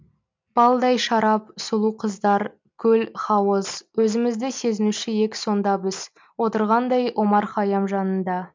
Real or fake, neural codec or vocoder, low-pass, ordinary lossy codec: real; none; 7.2 kHz; MP3, 64 kbps